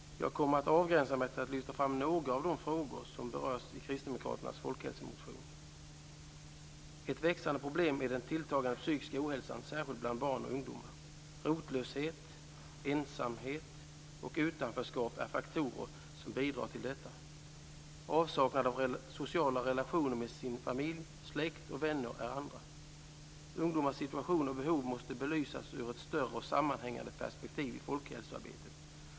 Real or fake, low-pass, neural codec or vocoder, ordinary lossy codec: real; none; none; none